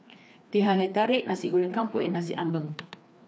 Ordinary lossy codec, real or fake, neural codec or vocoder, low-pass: none; fake; codec, 16 kHz, 2 kbps, FreqCodec, larger model; none